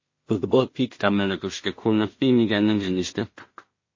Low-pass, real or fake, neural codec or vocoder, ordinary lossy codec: 7.2 kHz; fake; codec, 16 kHz in and 24 kHz out, 0.4 kbps, LongCat-Audio-Codec, two codebook decoder; MP3, 32 kbps